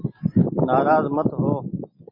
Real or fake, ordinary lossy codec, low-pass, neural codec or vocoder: real; MP3, 24 kbps; 5.4 kHz; none